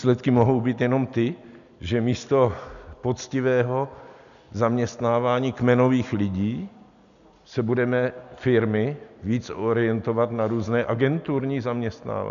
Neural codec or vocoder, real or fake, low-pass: none; real; 7.2 kHz